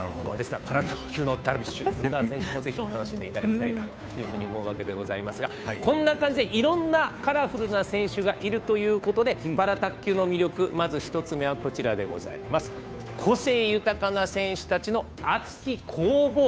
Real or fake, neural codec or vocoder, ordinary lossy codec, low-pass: fake; codec, 16 kHz, 2 kbps, FunCodec, trained on Chinese and English, 25 frames a second; none; none